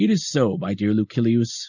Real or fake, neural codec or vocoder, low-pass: real; none; 7.2 kHz